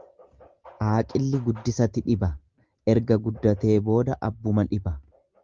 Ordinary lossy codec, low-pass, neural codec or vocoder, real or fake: Opus, 32 kbps; 7.2 kHz; none; real